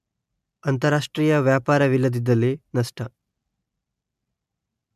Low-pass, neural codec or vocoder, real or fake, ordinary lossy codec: 14.4 kHz; none; real; MP3, 96 kbps